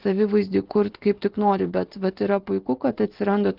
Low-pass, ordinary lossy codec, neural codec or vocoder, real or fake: 5.4 kHz; Opus, 16 kbps; none; real